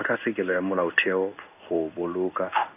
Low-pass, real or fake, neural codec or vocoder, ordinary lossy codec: 3.6 kHz; fake; codec, 16 kHz in and 24 kHz out, 1 kbps, XY-Tokenizer; none